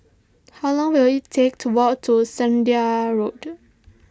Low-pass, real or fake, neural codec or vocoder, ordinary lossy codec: none; real; none; none